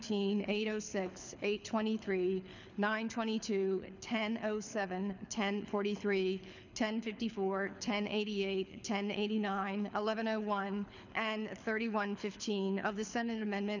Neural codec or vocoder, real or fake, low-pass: codec, 24 kHz, 6 kbps, HILCodec; fake; 7.2 kHz